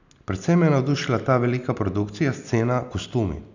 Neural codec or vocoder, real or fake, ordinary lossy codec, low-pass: none; real; none; 7.2 kHz